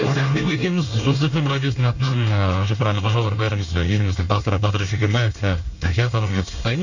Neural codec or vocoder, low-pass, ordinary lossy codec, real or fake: codec, 24 kHz, 1 kbps, SNAC; 7.2 kHz; none; fake